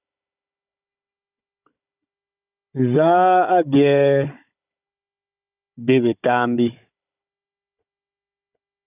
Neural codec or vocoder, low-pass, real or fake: codec, 16 kHz, 16 kbps, FunCodec, trained on Chinese and English, 50 frames a second; 3.6 kHz; fake